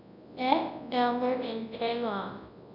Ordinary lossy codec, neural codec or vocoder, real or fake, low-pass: none; codec, 24 kHz, 0.9 kbps, WavTokenizer, large speech release; fake; 5.4 kHz